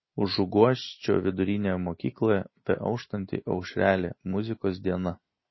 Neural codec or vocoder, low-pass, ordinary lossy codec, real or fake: none; 7.2 kHz; MP3, 24 kbps; real